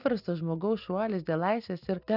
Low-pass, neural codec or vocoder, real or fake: 5.4 kHz; none; real